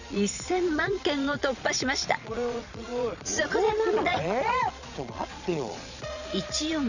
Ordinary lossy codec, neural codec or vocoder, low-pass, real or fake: none; vocoder, 22.05 kHz, 80 mel bands, Vocos; 7.2 kHz; fake